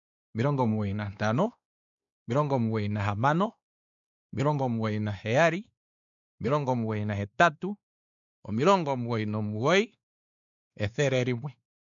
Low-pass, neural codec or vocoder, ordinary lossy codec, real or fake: 7.2 kHz; codec, 16 kHz, 2 kbps, X-Codec, WavLM features, trained on Multilingual LibriSpeech; none; fake